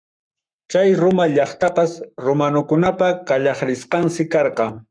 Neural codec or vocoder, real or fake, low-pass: codec, 44.1 kHz, 7.8 kbps, Pupu-Codec; fake; 9.9 kHz